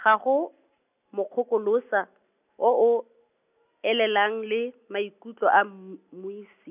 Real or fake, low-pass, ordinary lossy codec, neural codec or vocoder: real; 3.6 kHz; none; none